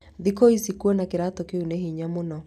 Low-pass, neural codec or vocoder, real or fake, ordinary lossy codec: 14.4 kHz; none; real; none